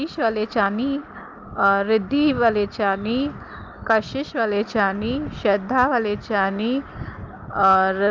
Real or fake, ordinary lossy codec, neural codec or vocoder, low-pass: real; Opus, 24 kbps; none; 7.2 kHz